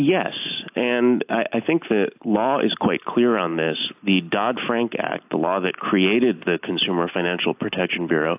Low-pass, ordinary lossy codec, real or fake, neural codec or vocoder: 3.6 kHz; AAC, 32 kbps; real; none